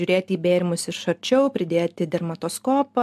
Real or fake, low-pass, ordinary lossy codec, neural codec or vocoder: real; 14.4 kHz; MP3, 96 kbps; none